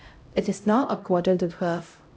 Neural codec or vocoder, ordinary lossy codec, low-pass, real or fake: codec, 16 kHz, 0.5 kbps, X-Codec, HuBERT features, trained on LibriSpeech; none; none; fake